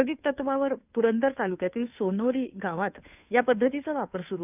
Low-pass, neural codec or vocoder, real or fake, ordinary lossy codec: 3.6 kHz; codec, 16 kHz, 2 kbps, FunCodec, trained on Chinese and English, 25 frames a second; fake; none